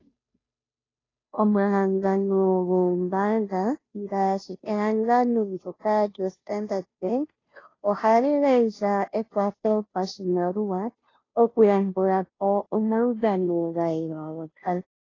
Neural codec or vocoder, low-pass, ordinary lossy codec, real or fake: codec, 16 kHz, 0.5 kbps, FunCodec, trained on Chinese and English, 25 frames a second; 7.2 kHz; AAC, 32 kbps; fake